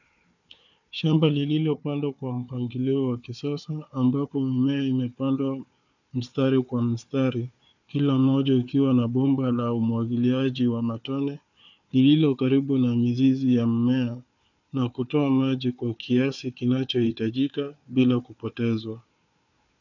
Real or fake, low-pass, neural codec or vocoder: fake; 7.2 kHz; codec, 16 kHz, 4 kbps, FunCodec, trained on Chinese and English, 50 frames a second